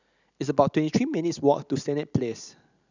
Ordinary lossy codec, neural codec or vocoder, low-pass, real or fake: none; none; 7.2 kHz; real